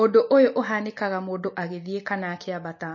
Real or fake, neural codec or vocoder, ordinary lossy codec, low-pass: real; none; MP3, 32 kbps; 7.2 kHz